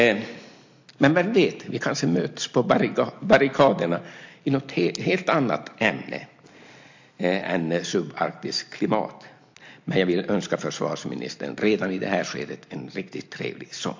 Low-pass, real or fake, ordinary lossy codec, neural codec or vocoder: 7.2 kHz; real; MP3, 48 kbps; none